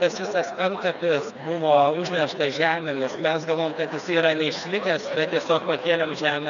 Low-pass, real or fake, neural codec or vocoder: 7.2 kHz; fake; codec, 16 kHz, 2 kbps, FreqCodec, smaller model